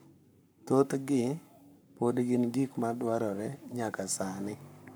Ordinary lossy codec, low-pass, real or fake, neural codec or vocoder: none; none; fake; codec, 44.1 kHz, 7.8 kbps, Pupu-Codec